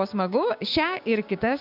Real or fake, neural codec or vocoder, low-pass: fake; codec, 16 kHz, 6 kbps, DAC; 5.4 kHz